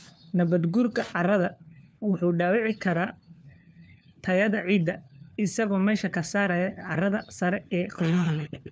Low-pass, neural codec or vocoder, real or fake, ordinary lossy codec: none; codec, 16 kHz, 4 kbps, FunCodec, trained on LibriTTS, 50 frames a second; fake; none